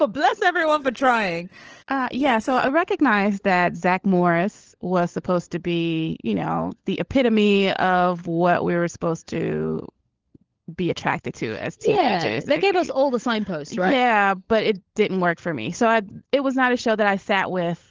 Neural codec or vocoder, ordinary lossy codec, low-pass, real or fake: codec, 16 kHz, 16 kbps, FunCodec, trained on LibriTTS, 50 frames a second; Opus, 16 kbps; 7.2 kHz; fake